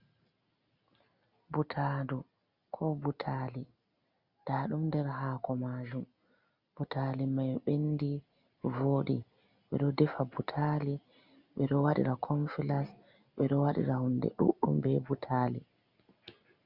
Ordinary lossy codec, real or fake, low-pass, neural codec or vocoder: Opus, 64 kbps; real; 5.4 kHz; none